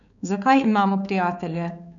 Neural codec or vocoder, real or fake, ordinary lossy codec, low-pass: codec, 16 kHz, 4 kbps, X-Codec, HuBERT features, trained on balanced general audio; fake; none; 7.2 kHz